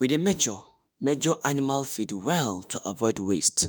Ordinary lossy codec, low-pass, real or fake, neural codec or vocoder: none; none; fake; autoencoder, 48 kHz, 32 numbers a frame, DAC-VAE, trained on Japanese speech